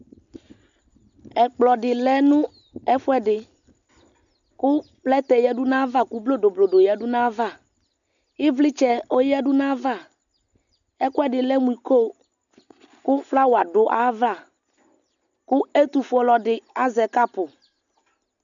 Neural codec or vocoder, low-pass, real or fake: none; 7.2 kHz; real